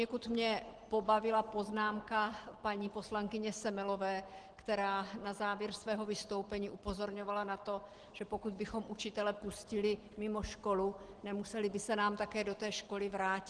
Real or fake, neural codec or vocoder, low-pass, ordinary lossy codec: real; none; 9.9 kHz; Opus, 16 kbps